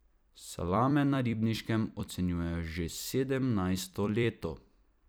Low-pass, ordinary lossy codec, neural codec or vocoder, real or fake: none; none; vocoder, 44.1 kHz, 128 mel bands every 256 samples, BigVGAN v2; fake